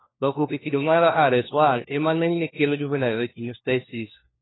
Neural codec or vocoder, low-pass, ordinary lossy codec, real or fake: codec, 16 kHz, 1 kbps, FunCodec, trained on LibriTTS, 50 frames a second; 7.2 kHz; AAC, 16 kbps; fake